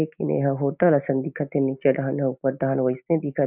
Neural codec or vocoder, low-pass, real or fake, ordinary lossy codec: none; 3.6 kHz; real; none